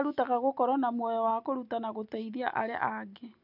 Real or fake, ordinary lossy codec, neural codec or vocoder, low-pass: real; none; none; 5.4 kHz